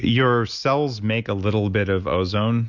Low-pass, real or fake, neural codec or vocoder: 7.2 kHz; real; none